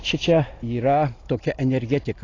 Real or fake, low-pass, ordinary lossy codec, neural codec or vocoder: real; 7.2 kHz; AAC, 32 kbps; none